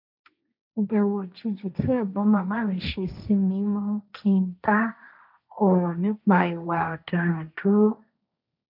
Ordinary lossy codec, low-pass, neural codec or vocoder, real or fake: none; 5.4 kHz; codec, 16 kHz, 1.1 kbps, Voila-Tokenizer; fake